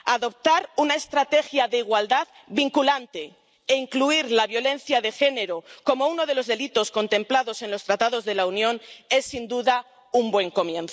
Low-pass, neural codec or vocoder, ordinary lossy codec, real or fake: none; none; none; real